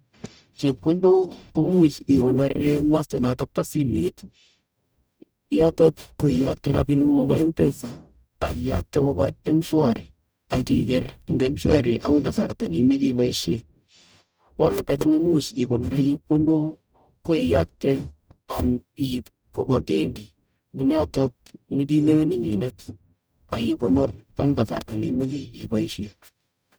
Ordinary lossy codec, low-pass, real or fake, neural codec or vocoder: none; none; fake; codec, 44.1 kHz, 0.9 kbps, DAC